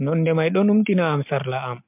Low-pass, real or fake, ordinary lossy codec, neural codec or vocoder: 3.6 kHz; real; MP3, 32 kbps; none